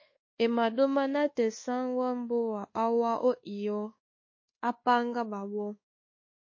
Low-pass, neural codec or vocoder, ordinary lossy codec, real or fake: 7.2 kHz; codec, 24 kHz, 1.2 kbps, DualCodec; MP3, 32 kbps; fake